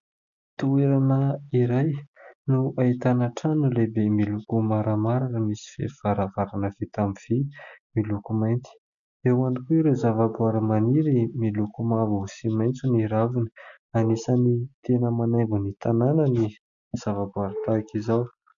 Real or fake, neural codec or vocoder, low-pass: real; none; 7.2 kHz